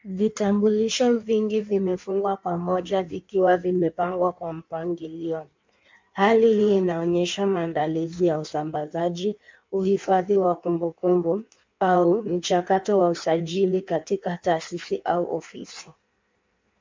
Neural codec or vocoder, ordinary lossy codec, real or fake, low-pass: codec, 16 kHz in and 24 kHz out, 1.1 kbps, FireRedTTS-2 codec; MP3, 64 kbps; fake; 7.2 kHz